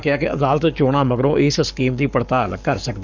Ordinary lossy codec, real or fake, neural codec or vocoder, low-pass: none; fake; codec, 44.1 kHz, 7.8 kbps, Pupu-Codec; 7.2 kHz